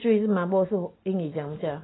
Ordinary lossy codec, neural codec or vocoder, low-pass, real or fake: AAC, 16 kbps; none; 7.2 kHz; real